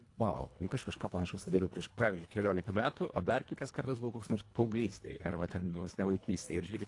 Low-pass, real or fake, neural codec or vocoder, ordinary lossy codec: 10.8 kHz; fake; codec, 24 kHz, 1.5 kbps, HILCodec; AAC, 48 kbps